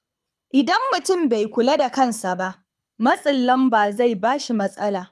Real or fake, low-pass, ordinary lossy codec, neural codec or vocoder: fake; none; none; codec, 24 kHz, 6 kbps, HILCodec